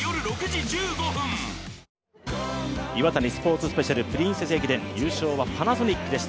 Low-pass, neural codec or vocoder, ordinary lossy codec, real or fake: none; none; none; real